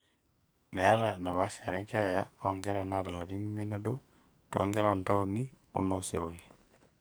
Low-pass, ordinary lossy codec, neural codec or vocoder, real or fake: none; none; codec, 44.1 kHz, 2.6 kbps, SNAC; fake